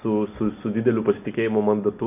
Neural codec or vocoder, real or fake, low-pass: none; real; 3.6 kHz